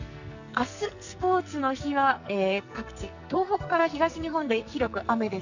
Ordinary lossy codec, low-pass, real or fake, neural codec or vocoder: none; 7.2 kHz; fake; codec, 44.1 kHz, 2.6 kbps, SNAC